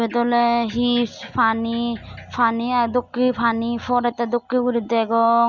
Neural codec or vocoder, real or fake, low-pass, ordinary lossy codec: none; real; 7.2 kHz; none